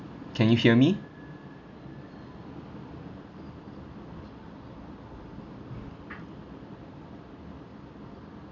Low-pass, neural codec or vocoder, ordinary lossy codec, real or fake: 7.2 kHz; none; none; real